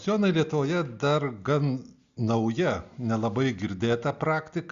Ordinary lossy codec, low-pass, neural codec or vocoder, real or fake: Opus, 64 kbps; 7.2 kHz; none; real